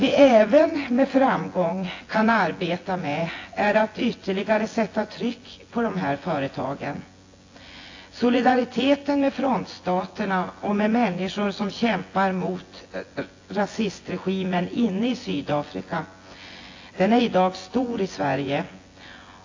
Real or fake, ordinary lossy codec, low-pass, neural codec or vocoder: fake; AAC, 32 kbps; 7.2 kHz; vocoder, 24 kHz, 100 mel bands, Vocos